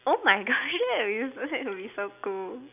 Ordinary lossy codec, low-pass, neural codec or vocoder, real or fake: none; 3.6 kHz; none; real